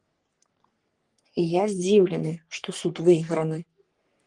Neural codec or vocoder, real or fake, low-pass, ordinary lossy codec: codec, 44.1 kHz, 3.4 kbps, Pupu-Codec; fake; 10.8 kHz; Opus, 24 kbps